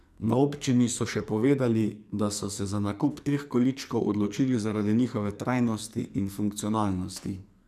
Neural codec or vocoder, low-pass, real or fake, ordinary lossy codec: codec, 44.1 kHz, 2.6 kbps, SNAC; 14.4 kHz; fake; none